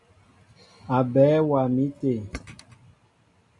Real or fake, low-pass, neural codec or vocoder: real; 10.8 kHz; none